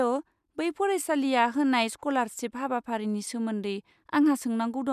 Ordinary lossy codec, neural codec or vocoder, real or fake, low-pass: none; none; real; 14.4 kHz